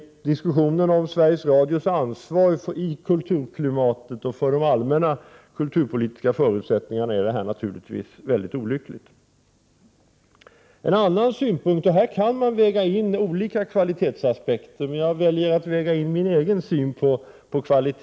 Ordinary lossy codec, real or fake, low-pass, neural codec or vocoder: none; real; none; none